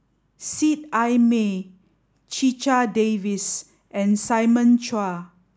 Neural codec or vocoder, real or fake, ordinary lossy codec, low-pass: none; real; none; none